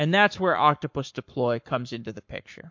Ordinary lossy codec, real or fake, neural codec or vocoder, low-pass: MP3, 48 kbps; fake; codec, 44.1 kHz, 7.8 kbps, Pupu-Codec; 7.2 kHz